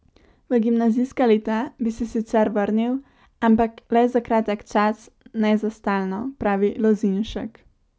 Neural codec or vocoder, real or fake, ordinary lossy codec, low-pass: none; real; none; none